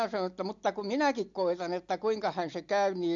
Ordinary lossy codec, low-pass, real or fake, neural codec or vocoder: MP3, 48 kbps; 7.2 kHz; real; none